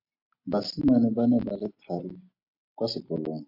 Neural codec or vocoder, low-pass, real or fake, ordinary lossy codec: none; 5.4 kHz; real; AAC, 48 kbps